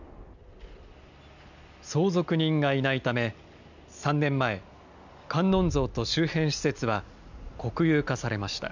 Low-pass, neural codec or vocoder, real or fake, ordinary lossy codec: 7.2 kHz; none; real; none